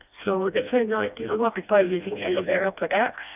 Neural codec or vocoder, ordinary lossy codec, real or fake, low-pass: codec, 16 kHz, 1 kbps, FreqCodec, smaller model; none; fake; 3.6 kHz